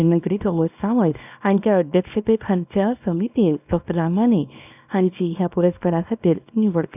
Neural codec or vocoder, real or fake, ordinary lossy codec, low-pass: codec, 24 kHz, 0.9 kbps, WavTokenizer, small release; fake; none; 3.6 kHz